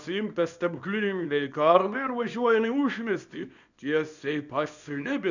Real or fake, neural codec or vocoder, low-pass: fake; codec, 24 kHz, 0.9 kbps, WavTokenizer, small release; 7.2 kHz